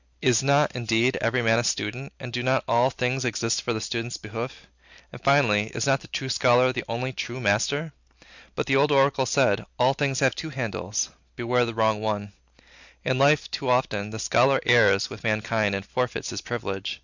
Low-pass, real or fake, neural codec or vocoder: 7.2 kHz; real; none